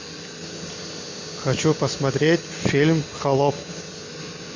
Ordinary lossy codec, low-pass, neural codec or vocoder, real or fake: MP3, 48 kbps; 7.2 kHz; none; real